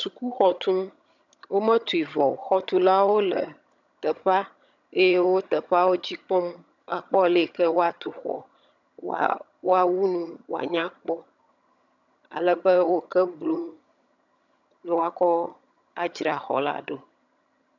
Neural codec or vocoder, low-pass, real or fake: vocoder, 22.05 kHz, 80 mel bands, HiFi-GAN; 7.2 kHz; fake